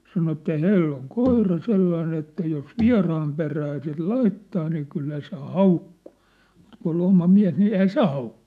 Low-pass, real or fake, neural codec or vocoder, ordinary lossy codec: 14.4 kHz; fake; autoencoder, 48 kHz, 128 numbers a frame, DAC-VAE, trained on Japanese speech; none